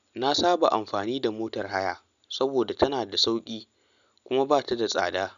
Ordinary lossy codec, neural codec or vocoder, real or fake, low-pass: none; none; real; 7.2 kHz